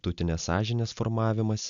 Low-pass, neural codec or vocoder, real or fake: 7.2 kHz; none; real